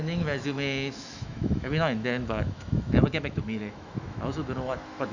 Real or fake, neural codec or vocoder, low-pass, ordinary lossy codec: fake; autoencoder, 48 kHz, 128 numbers a frame, DAC-VAE, trained on Japanese speech; 7.2 kHz; none